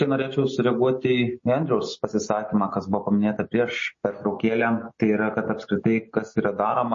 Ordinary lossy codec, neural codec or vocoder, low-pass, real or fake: MP3, 32 kbps; none; 7.2 kHz; real